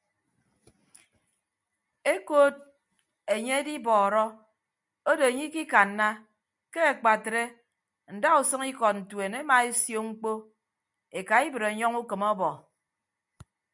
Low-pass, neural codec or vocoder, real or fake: 10.8 kHz; none; real